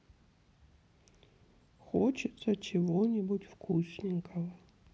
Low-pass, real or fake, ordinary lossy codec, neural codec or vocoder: none; real; none; none